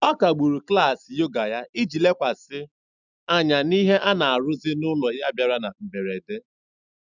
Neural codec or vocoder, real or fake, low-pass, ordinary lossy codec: none; real; 7.2 kHz; none